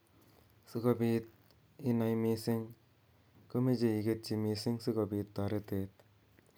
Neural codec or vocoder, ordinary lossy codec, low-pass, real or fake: none; none; none; real